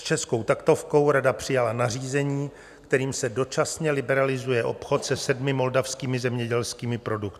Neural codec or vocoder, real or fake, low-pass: none; real; 14.4 kHz